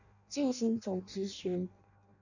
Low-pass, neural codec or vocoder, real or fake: 7.2 kHz; codec, 16 kHz in and 24 kHz out, 0.6 kbps, FireRedTTS-2 codec; fake